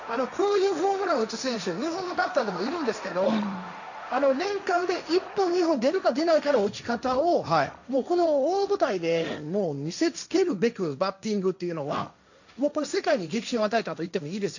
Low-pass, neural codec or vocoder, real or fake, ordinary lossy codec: 7.2 kHz; codec, 16 kHz, 1.1 kbps, Voila-Tokenizer; fake; none